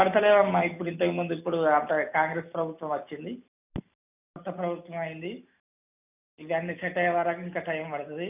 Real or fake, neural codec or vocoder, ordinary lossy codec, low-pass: real; none; none; 3.6 kHz